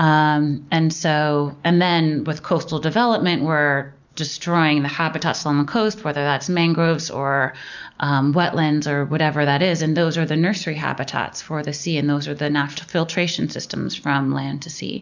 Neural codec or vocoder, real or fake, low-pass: none; real; 7.2 kHz